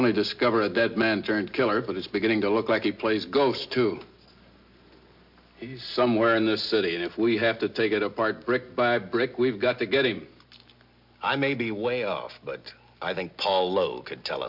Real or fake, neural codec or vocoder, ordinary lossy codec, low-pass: real; none; MP3, 48 kbps; 5.4 kHz